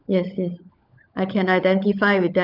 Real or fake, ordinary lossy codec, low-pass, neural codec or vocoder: fake; none; 5.4 kHz; codec, 16 kHz, 16 kbps, FunCodec, trained on LibriTTS, 50 frames a second